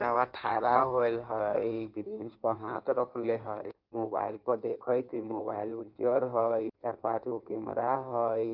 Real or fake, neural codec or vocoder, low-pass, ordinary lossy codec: fake; codec, 16 kHz in and 24 kHz out, 1.1 kbps, FireRedTTS-2 codec; 5.4 kHz; Opus, 32 kbps